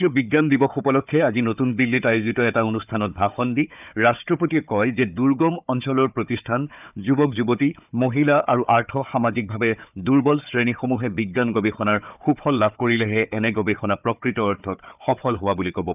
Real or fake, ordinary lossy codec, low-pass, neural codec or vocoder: fake; none; 3.6 kHz; codec, 16 kHz, 4 kbps, FunCodec, trained on Chinese and English, 50 frames a second